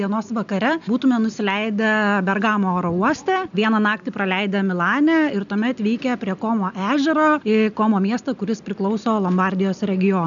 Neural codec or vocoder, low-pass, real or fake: none; 7.2 kHz; real